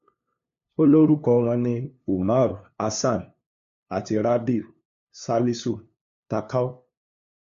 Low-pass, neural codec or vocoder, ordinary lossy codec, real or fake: 7.2 kHz; codec, 16 kHz, 2 kbps, FunCodec, trained on LibriTTS, 25 frames a second; MP3, 48 kbps; fake